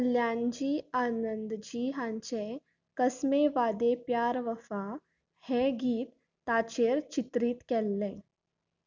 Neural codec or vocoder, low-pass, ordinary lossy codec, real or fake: none; 7.2 kHz; none; real